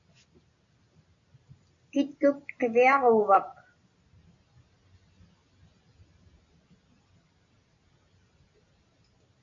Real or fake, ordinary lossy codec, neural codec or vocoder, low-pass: real; AAC, 64 kbps; none; 7.2 kHz